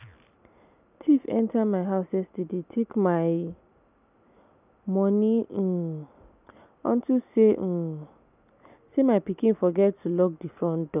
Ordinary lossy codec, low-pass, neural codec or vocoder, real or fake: none; 3.6 kHz; none; real